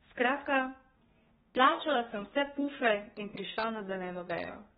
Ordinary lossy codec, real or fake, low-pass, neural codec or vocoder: AAC, 16 kbps; fake; 14.4 kHz; codec, 32 kHz, 1.9 kbps, SNAC